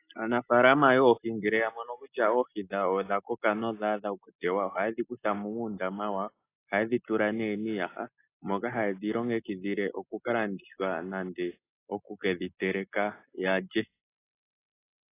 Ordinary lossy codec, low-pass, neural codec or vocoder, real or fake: AAC, 24 kbps; 3.6 kHz; none; real